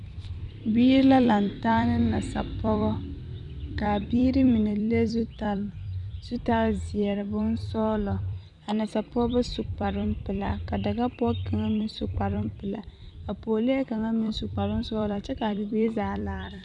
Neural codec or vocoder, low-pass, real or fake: vocoder, 44.1 kHz, 128 mel bands every 256 samples, BigVGAN v2; 10.8 kHz; fake